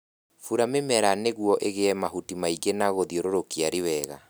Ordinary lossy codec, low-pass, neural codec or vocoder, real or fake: none; none; none; real